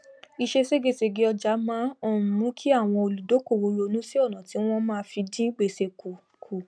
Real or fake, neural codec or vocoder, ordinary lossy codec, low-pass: real; none; none; none